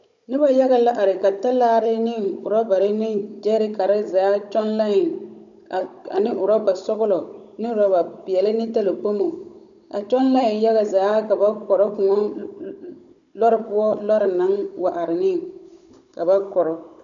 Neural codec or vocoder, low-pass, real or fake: codec, 16 kHz, 16 kbps, FunCodec, trained on Chinese and English, 50 frames a second; 7.2 kHz; fake